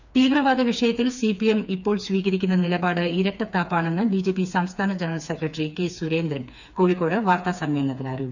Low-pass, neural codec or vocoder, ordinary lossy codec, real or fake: 7.2 kHz; codec, 16 kHz, 4 kbps, FreqCodec, smaller model; none; fake